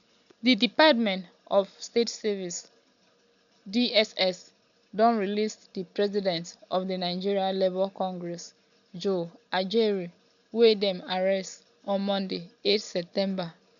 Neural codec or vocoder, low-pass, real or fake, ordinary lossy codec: none; 7.2 kHz; real; none